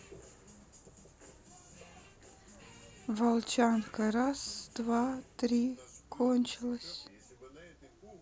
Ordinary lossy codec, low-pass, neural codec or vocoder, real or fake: none; none; none; real